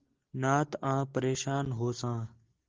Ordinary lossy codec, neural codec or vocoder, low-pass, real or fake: Opus, 16 kbps; none; 7.2 kHz; real